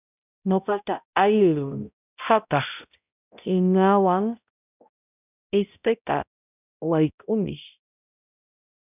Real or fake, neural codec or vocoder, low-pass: fake; codec, 16 kHz, 0.5 kbps, X-Codec, HuBERT features, trained on balanced general audio; 3.6 kHz